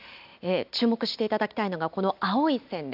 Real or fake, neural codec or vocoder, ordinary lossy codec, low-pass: real; none; AAC, 48 kbps; 5.4 kHz